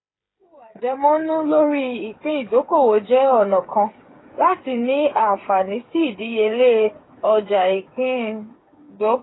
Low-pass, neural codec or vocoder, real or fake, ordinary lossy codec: 7.2 kHz; codec, 16 kHz, 8 kbps, FreqCodec, smaller model; fake; AAC, 16 kbps